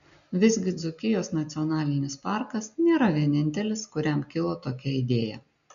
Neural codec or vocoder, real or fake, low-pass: none; real; 7.2 kHz